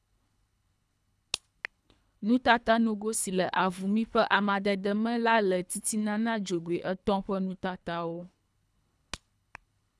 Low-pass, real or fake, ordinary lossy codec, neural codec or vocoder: none; fake; none; codec, 24 kHz, 3 kbps, HILCodec